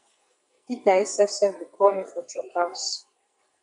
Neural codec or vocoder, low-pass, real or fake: codec, 44.1 kHz, 2.6 kbps, SNAC; 10.8 kHz; fake